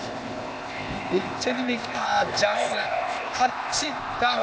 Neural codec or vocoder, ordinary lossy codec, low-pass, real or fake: codec, 16 kHz, 0.8 kbps, ZipCodec; none; none; fake